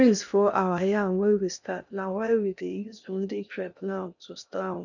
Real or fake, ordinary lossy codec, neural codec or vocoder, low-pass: fake; none; codec, 16 kHz in and 24 kHz out, 0.6 kbps, FocalCodec, streaming, 2048 codes; 7.2 kHz